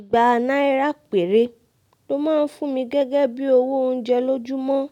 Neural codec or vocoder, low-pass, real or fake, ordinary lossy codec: none; 19.8 kHz; real; none